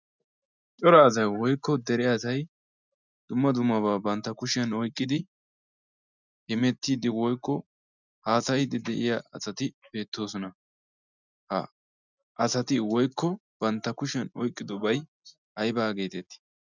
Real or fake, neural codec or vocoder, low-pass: real; none; 7.2 kHz